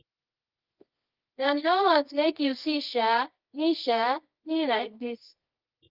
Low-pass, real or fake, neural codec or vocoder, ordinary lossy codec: 5.4 kHz; fake; codec, 24 kHz, 0.9 kbps, WavTokenizer, medium music audio release; Opus, 24 kbps